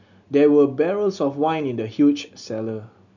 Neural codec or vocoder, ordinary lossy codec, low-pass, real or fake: none; none; 7.2 kHz; real